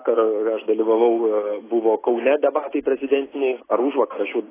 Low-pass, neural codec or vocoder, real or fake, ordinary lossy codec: 3.6 kHz; none; real; AAC, 16 kbps